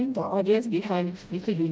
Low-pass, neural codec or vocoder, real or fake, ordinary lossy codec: none; codec, 16 kHz, 0.5 kbps, FreqCodec, smaller model; fake; none